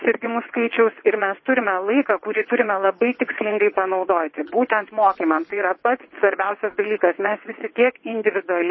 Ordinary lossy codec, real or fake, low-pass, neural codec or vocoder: MP3, 24 kbps; fake; 7.2 kHz; vocoder, 22.05 kHz, 80 mel bands, WaveNeXt